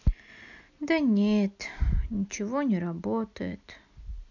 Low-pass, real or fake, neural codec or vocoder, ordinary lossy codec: 7.2 kHz; real; none; none